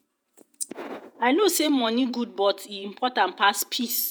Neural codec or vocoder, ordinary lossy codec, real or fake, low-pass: vocoder, 48 kHz, 128 mel bands, Vocos; none; fake; none